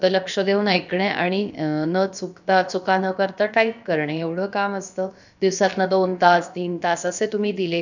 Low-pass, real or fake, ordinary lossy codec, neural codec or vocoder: 7.2 kHz; fake; none; codec, 16 kHz, about 1 kbps, DyCAST, with the encoder's durations